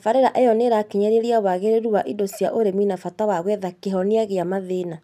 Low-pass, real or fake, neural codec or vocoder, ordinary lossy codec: 14.4 kHz; real; none; none